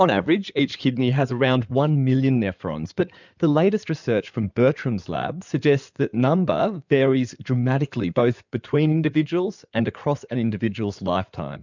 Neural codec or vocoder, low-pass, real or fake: codec, 16 kHz in and 24 kHz out, 2.2 kbps, FireRedTTS-2 codec; 7.2 kHz; fake